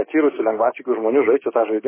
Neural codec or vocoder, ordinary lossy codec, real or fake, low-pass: codec, 16 kHz, 6 kbps, DAC; MP3, 16 kbps; fake; 3.6 kHz